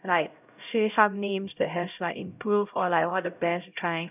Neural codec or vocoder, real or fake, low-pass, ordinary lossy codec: codec, 16 kHz, 0.5 kbps, X-Codec, HuBERT features, trained on LibriSpeech; fake; 3.6 kHz; none